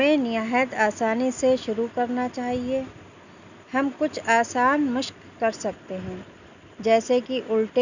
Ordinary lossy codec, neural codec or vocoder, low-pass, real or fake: none; none; 7.2 kHz; real